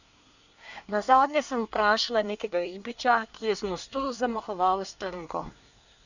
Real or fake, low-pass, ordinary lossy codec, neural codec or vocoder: fake; 7.2 kHz; none; codec, 24 kHz, 1 kbps, SNAC